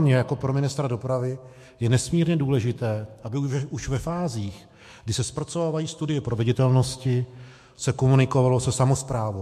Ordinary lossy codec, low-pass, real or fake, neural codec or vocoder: MP3, 64 kbps; 14.4 kHz; fake; autoencoder, 48 kHz, 128 numbers a frame, DAC-VAE, trained on Japanese speech